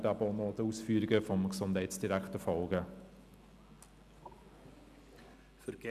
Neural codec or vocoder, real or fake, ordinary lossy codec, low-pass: none; real; none; 14.4 kHz